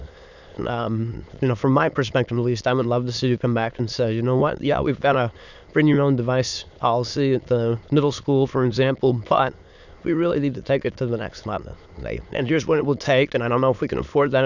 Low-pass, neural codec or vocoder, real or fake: 7.2 kHz; autoencoder, 22.05 kHz, a latent of 192 numbers a frame, VITS, trained on many speakers; fake